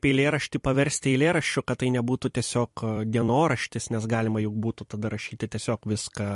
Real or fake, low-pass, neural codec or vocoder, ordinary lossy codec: fake; 14.4 kHz; vocoder, 44.1 kHz, 128 mel bands every 256 samples, BigVGAN v2; MP3, 48 kbps